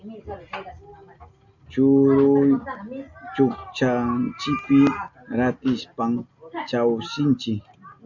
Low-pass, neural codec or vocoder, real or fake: 7.2 kHz; none; real